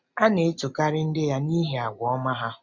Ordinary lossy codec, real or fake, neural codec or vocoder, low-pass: none; real; none; 7.2 kHz